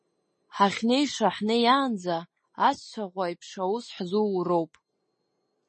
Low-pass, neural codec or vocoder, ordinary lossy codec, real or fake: 10.8 kHz; none; MP3, 32 kbps; real